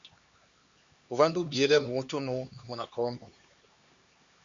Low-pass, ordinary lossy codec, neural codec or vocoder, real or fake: 7.2 kHz; Opus, 64 kbps; codec, 16 kHz, 2 kbps, X-Codec, HuBERT features, trained on LibriSpeech; fake